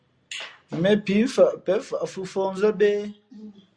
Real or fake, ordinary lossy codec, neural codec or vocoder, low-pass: real; Opus, 64 kbps; none; 9.9 kHz